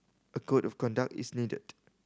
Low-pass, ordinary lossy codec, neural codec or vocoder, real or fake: none; none; none; real